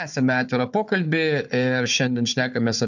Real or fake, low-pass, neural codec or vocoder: fake; 7.2 kHz; codec, 16 kHz, 4 kbps, FunCodec, trained on Chinese and English, 50 frames a second